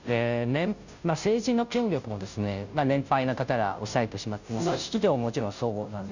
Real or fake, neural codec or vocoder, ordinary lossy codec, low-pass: fake; codec, 16 kHz, 0.5 kbps, FunCodec, trained on Chinese and English, 25 frames a second; none; 7.2 kHz